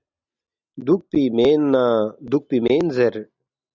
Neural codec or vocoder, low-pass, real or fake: none; 7.2 kHz; real